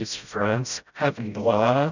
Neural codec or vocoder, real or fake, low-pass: codec, 16 kHz, 0.5 kbps, FreqCodec, smaller model; fake; 7.2 kHz